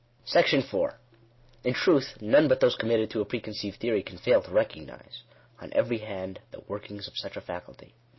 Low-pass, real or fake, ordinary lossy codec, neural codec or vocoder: 7.2 kHz; real; MP3, 24 kbps; none